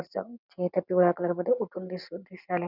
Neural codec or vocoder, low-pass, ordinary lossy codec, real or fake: none; 5.4 kHz; none; real